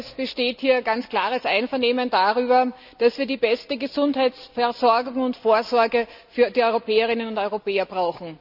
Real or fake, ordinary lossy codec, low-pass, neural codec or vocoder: real; none; 5.4 kHz; none